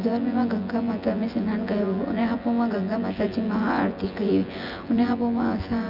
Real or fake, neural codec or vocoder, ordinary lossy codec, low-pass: fake; vocoder, 24 kHz, 100 mel bands, Vocos; none; 5.4 kHz